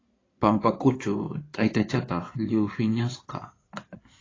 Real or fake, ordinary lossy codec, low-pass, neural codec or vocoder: fake; AAC, 32 kbps; 7.2 kHz; codec, 16 kHz in and 24 kHz out, 2.2 kbps, FireRedTTS-2 codec